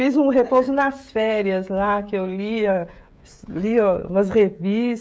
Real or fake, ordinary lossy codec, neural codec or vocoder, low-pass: fake; none; codec, 16 kHz, 16 kbps, FreqCodec, smaller model; none